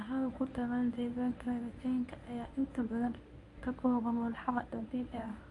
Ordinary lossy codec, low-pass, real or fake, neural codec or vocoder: AAC, 48 kbps; 10.8 kHz; fake; codec, 24 kHz, 0.9 kbps, WavTokenizer, medium speech release version 1